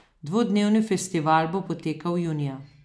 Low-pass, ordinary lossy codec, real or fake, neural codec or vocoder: none; none; real; none